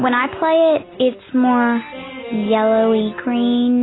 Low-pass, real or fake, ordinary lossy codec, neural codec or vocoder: 7.2 kHz; real; AAC, 16 kbps; none